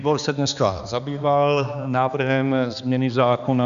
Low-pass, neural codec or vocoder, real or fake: 7.2 kHz; codec, 16 kHz, 2 kbps, X-Codec, HuBERT features, trained on balanced general audio; fake